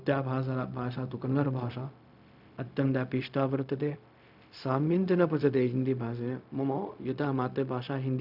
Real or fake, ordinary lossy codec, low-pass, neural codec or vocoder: fake; none; 5.4 kHz; codec, 16 kHz, 0.4 kbps, LongCat-Audio-Codec